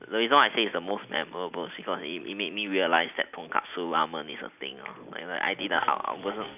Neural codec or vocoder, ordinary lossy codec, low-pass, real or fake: none; none; 3.6 kHz; real